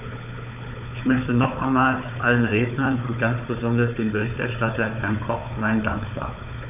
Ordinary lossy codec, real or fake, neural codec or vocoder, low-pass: none; fake; codec, 16 kHz, 4 kbps, FunCodec, trained on Chinese and English, 50 frames a second; 3.6 kHz